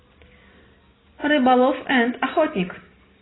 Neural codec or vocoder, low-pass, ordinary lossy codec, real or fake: none; 7.2 kHz; AAC, 16 kbps; real